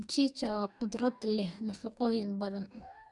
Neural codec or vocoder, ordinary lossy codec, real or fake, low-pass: codec, 44.1 kHz, 2.6 kbps, DAC; none; fake; 10.8 kHz